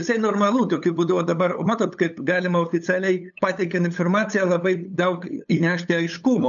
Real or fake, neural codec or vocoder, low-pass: fake; codec, 16 kHz, 8 kbps, FunCodec, trained on LibriTTS, 25 frames a second; 7.2 kHz